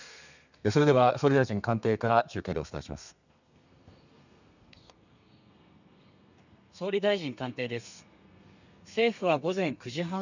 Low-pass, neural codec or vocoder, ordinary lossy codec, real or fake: 7.2 kHz; codec, 32 kHz, 1.9 kbps, SNAC; none; fake